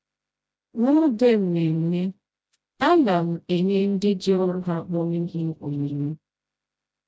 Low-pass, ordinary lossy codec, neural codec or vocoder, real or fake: none; none; codec, 16 kHz, 0.5 kbps, FreqCodec, smaller model; fake